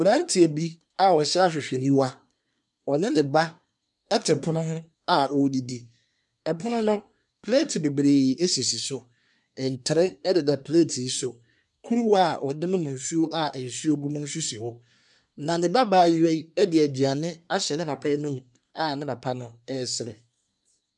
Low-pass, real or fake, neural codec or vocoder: 10.8 kHz; fake; codec, 24 kHz, 1 kbps, SNAC